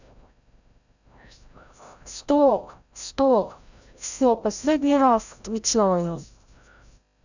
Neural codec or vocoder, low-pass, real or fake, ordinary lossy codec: codec, 16 kHz, 0.5 kbps, FreqCodec, larger model; 7.2 kHz; fake; none